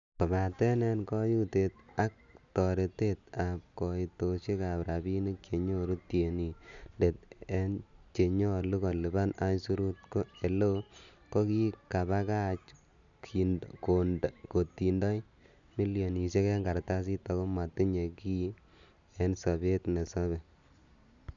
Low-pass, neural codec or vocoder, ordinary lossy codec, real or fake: 7.2 kHz; none; none; real